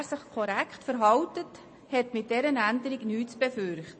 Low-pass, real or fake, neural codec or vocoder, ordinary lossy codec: 9.9 kHz; real; none; MP3, 32 kbps